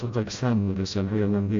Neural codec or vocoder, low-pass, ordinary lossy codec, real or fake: codec, 16 kHz, 0.5 kbps, FreqCodec, smaller model; 7.2 kHz; AAC, 64 kbps; fake